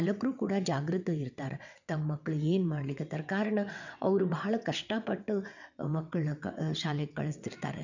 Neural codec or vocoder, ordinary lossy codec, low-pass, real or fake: vocoder, 22.05 kHz, 80 mel bands, WaveNeXt; none; 7.2 kHz; fake